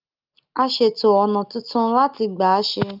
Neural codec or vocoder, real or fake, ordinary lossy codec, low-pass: none; real; Opus, 24 kbps; 5.4 kHz